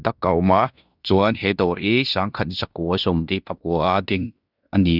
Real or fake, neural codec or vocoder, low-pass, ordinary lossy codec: fake; codec, 16 kHz in and 24 kHz out, 0.9 kbps, LongCat-Audio-Codec, fine tuned four codebook decoder; 5.4 kHz; none